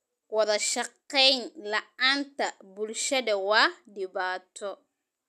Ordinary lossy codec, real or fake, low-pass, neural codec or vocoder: none; real; 14.4 kHz; none